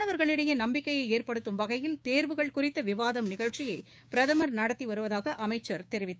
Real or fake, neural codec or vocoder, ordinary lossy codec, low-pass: fake; codec, 16 kHz, 6 kbps, DAC; none; none